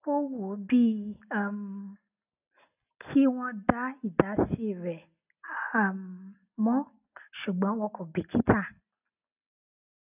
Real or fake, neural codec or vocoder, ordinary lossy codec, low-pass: real; none; none; 3.6 kHz